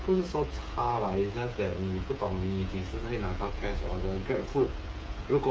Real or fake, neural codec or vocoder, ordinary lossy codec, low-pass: fake; codec, 16 kHz, 8 kbps, FreqCodec, smaller model; none; none